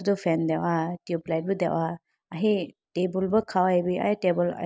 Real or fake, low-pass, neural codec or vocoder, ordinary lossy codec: real; none; none; none